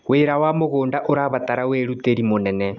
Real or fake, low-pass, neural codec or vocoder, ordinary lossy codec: real; 7.2 kHz; none; none